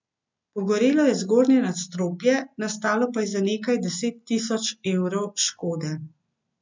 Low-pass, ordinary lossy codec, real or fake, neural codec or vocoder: 7.2 kHz; MP3, 64 kbps; real; none